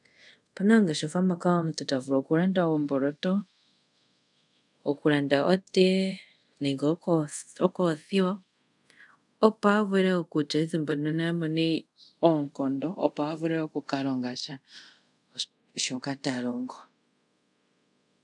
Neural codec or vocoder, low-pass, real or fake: codec, 24 kHz, 0.5 kbps, DualCodec; 10.8 kHz; fake